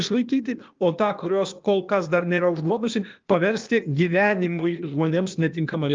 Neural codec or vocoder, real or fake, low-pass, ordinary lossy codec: codec, 16 kHz, 0.8 kbps, ZipCodec; fake; 7.2 kHz; Opus, 24 kbps